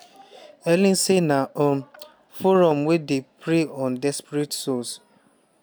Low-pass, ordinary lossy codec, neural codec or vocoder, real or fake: none; none; none; real